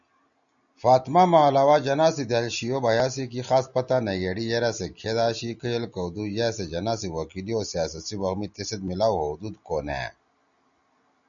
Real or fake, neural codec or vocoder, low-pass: real; none; 7.2 kHz